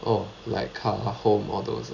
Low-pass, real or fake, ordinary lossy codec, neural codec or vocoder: 7.2 kHz; real; none; none